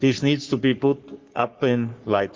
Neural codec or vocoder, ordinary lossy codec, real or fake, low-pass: codec, 44.1 kHz, 3.4 kbps, Pupu-Codec; Opus, 32 kbps; fake; 7.2 kHz